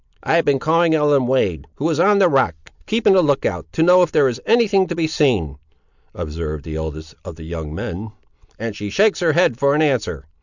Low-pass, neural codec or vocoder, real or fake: 7.2 kHz; none; real